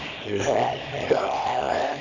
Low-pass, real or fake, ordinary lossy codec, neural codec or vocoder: 7.2 kHz; fake; none; codec, 24 kHz, 0.9 kbps, WavTokenizer, small release